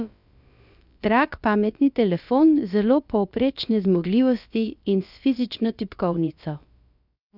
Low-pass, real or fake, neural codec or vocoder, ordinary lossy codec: 5.4 kHz; fake; codec, 16 kHz, about 1 kbps, DyCAST, with the encoder's durations; none